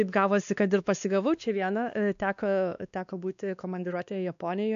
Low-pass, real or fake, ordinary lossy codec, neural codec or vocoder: 7.2 kHz; fake; AAC, 96 kbps; codec, 16 kHz, 2 kbps, X-Codec, WavLM features, trained on Multilingual LibriSpeech